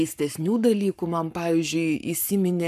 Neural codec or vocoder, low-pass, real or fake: codec, 44.1 kHz, 7.8 kbps, Pupu-Codec; 14.4 kHz; fake